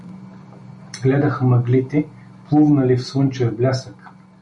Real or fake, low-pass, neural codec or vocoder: real; 10.8 kHz; none